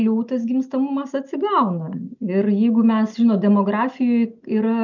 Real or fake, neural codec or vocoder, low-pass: real; none; 7.2 kHz